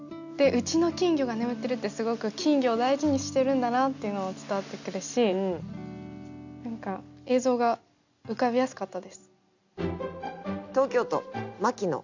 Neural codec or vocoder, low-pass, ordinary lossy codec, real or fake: none; 7.2 kHz; none; real